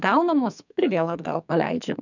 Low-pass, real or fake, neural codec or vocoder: 7.2 kHz; fake; codec, 44.1 kHz, 2.6 kbps, SNAC